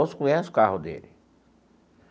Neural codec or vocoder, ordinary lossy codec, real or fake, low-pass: none; none; real; none